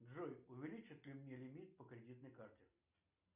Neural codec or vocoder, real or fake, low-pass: none; real; 3.6 kHz